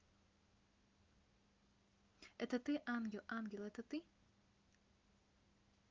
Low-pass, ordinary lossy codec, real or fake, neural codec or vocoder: 7.2 kHz; Opus, 24 kbps; real; none